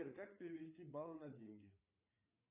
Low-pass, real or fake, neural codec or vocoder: 3.6 kHz; fake; codec, 16 kHz, 4 kbps, FreqCodec, larger model